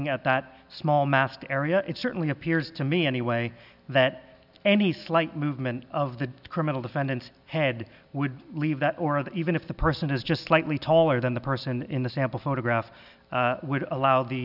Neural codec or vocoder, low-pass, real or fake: none; 5.4 kHz; real